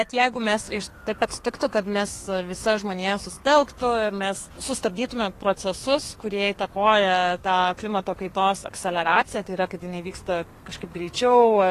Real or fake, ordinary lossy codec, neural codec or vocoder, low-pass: fake; AAC, 48 kbps; codec, 32 kHz, 1.9 kbps, SNAC; 14.4 kHz